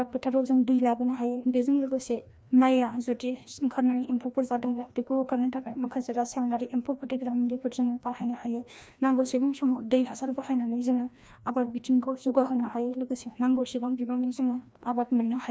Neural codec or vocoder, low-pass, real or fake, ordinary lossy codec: codec, 16 kHz, 1 kbps, FreqCodec, larger model; none; fake; none